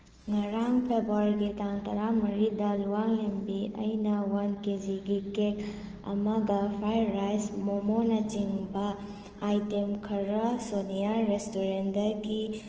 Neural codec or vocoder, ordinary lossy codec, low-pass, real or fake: autoencoder, 48 kHz, 128 numbers a frame, DAC-VAE, trained on Japanese speech; Opus, 16 kbps; 7.2 kHz; fake